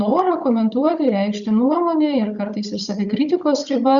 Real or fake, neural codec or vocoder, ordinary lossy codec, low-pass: fake; codec, 16 kHz, 16 kbps, FunCodec, trained on Chinese and English, 50 frames a second; Opus, 64 kbps; 7.2 kHz